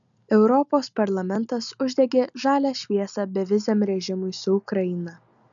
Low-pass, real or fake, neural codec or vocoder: 7.2 kHz; real; none